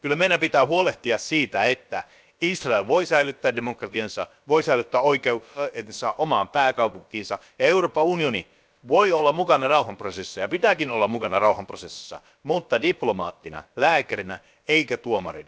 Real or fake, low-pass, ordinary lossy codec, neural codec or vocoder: fake; none; none; codec, 16 kHz, about 1 kbps, DyCAST, with the encoder's durations